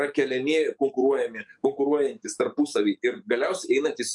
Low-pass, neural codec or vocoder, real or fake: 10.8 kHz; codec, 44.1 kHz, 7.8 kbps, DAC; fake